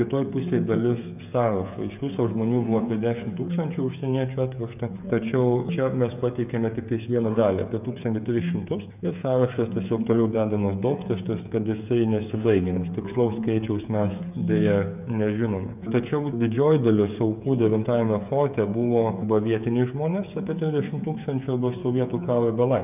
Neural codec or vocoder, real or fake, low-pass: codec, 16 kHz, 16 kbps, FreqCodec, smaller model; fake; 3.6 kHz